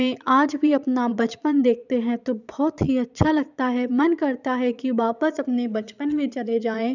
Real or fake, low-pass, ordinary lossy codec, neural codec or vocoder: fake; 7.2 kHz; none; vocoder, 22.05 kHz, 80 mel bands, Vocos